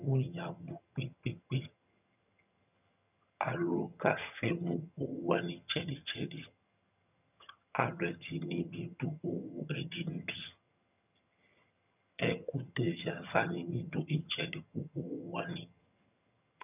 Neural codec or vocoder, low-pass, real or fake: vocoder, 22.05 kHz, 80 mel bands, HiFi-GAN; 3.6 kHz; fake